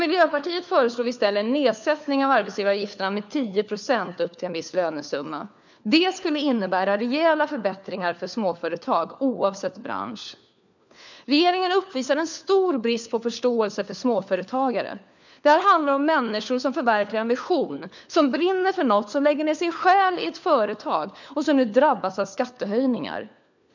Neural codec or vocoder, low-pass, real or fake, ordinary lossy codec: codec, 16 kHz, 4 kbps, FunCodec, trained on LibriTTS, 50 frames a second; 7.2 kHz; fake; none